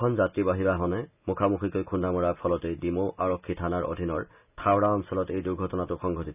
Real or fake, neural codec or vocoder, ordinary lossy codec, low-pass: real; none; none; 3.6 kHz